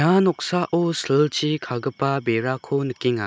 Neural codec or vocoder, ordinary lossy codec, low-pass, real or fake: none; none; none; real